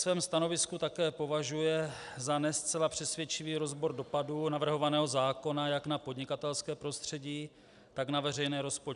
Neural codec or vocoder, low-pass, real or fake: none; 10.8 kHz; real